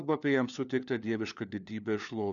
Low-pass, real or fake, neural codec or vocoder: 7.2 kHz; fake; codec, 16 kHz, 4 kbps, FunCodec, trained on LibriTTS, 50 frames a second